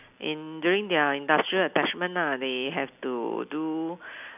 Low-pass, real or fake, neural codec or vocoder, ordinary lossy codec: 3.6 kHz; real; none; none